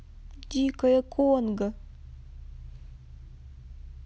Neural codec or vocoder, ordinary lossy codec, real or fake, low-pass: none; none; real; none